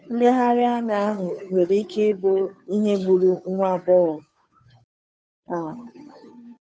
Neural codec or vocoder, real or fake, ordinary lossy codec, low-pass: codec, 16 kHz, 2 kbps, FunCodec, trained on Chinese and English, 25 frames a second; fake; none; none